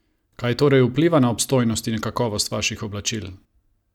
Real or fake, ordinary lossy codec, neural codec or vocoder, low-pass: real; none; none; 19.8 kHz